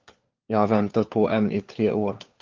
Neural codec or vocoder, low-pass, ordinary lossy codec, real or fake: codec, 16 kHz, 4 kbps, FunCodec, trained on LibriTTS, 50 frames a second; 7.2 kHz; Opus, 32 kbps; fake